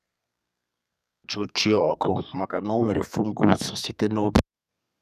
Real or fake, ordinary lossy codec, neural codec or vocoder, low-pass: fake; none; codec, 32 kHz, 1.9 kbps, SNAC; 14.4 kHz